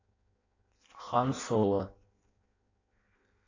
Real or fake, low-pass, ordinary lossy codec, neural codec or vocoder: fake; 7.2 kHz; AAC, 32 kbps; codec, 16 kHz in and 24 kHz out, 0.6 kbps, FireRedTTS-2 codec